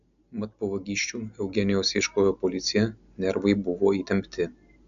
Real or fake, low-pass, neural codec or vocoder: real; 7.2 kHz; none